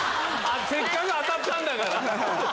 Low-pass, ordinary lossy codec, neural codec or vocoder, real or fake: none; none; none; real